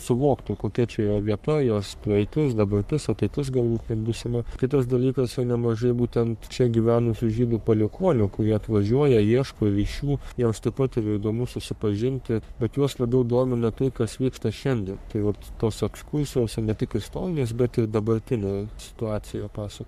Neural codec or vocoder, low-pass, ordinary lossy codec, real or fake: codec, 44.1 kHz, 3.4 kbps, Pupu-Codec; 14.4 kHz; MP3, 96 kbps; fake